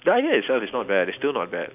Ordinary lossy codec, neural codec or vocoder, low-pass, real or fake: none; none; 3.6 kHz; real